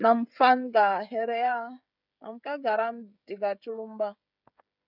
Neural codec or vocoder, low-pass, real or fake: codec, 16 kHz, 16 kbps, FreqCodec, smaller model; 5.4 kHz; fake